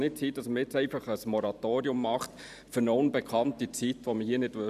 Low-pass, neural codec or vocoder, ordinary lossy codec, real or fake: 14.4 kHz; vocoder, 44.1 kHz, 128 mel bands every 512 samples, BigVGAN v2; none; fake